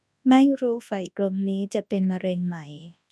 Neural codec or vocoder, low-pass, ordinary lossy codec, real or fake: codec, 24 kHz, 0.9 kbps, WavTokenizer, large speech release; none; none; fake